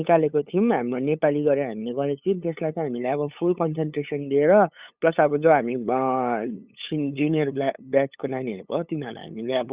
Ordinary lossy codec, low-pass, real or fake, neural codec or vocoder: Opus, 64 kbps; 3.6 kHz; fake; codec, 16 kHz, 8 kbps, FunCodec, trained on LibriTTS, 25 frames a second